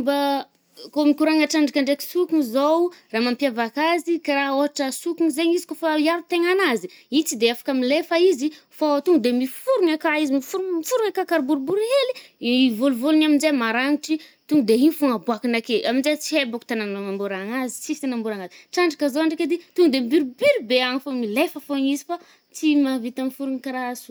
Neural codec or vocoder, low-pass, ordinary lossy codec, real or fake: none; none; none; real